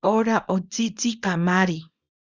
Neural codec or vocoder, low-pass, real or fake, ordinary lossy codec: codec, 24 kHz, 0.9 kbps, WavTokenizer, small release; 7.2 kHz; fake; Opus, 64 kbps